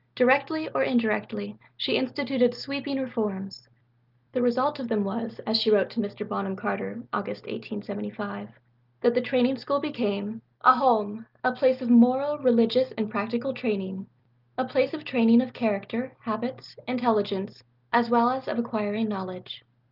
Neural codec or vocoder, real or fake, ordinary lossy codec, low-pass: none; real; Opus, 32 kbps; 5.4 kHz